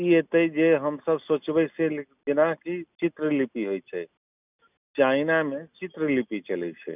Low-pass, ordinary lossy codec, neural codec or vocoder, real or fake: 3.6 kHz; none; none; real